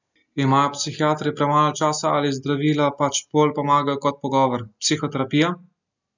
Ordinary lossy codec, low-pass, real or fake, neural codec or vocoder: none; 7.2 kHz; real; none